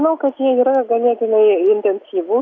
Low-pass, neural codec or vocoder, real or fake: 7.2 kHz; none; real